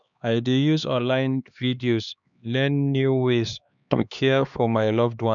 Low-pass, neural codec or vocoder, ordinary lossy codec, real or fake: 7.2 kHz; codec, 16 kHz, 2 kbps, X-Codec, HuBERT features, trained on LibriSpeech; none; fake